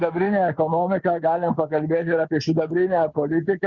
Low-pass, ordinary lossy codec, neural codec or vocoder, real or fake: 7.2 kHz; Opus, 64 kbps; none; real